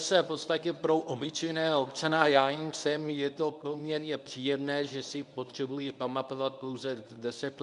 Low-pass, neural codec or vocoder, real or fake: 10.8 kHz; codec, 24 kHz, 0.9 kbps, WavTokenizer, medium speech release version 1; fake